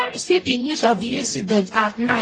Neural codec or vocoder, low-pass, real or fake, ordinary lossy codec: codec, 44.1 kHz, 0.9 kbps, DAC; 9.9 kHz; fake; AAC, 32 kbps